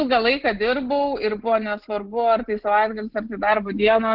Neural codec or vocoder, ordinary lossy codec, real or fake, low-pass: none; Opus, 16 kbps; real; 5.4 kHz